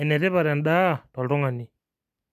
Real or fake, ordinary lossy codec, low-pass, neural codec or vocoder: real; MP3, 96 kbps; 14.4 kHz; none